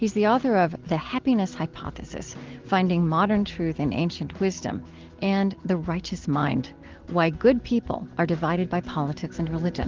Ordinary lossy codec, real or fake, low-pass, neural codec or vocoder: Opus, 16 kbps; real; 7.2 kHz; none